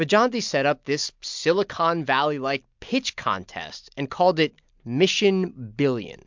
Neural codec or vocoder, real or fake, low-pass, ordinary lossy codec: none; real; 7.2 kHz; MP3, 64 kbps